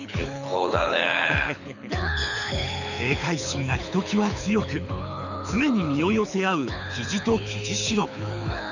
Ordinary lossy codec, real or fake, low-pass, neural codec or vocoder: none; fake; 7.2 kHz; codec, 24 kHz, 6 kbps, HILCodec